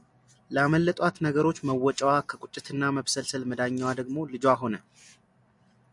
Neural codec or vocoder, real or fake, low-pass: none; real; 10.8 kHz